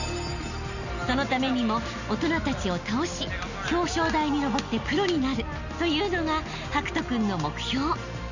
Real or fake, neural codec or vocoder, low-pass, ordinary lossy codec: real; none; 7.2 kHz; none